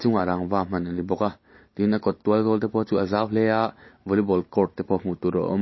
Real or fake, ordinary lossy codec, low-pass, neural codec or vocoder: real; MP3, 24 kbps; 7.2 kHz; none